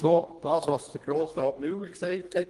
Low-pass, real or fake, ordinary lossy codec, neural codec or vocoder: 10.8 kHz; fake; Opus, 24 kbps; codec, 24 kHz, 1.5 kbps, HILCodec